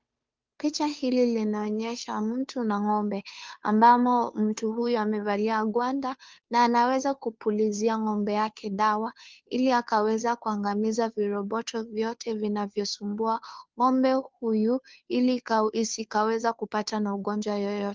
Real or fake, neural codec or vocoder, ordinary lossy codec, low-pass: fake; codec, 16 kHz, 2 kbps, FunCodec, trained on Chinese and English, 25 frames a second; Opus, 32 kbps; 7.2 kHz